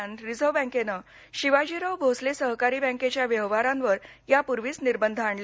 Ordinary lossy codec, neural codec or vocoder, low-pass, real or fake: none; none; none; real